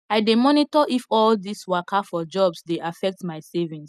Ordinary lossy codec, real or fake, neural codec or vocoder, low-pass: none; real; none; 14.4 kHz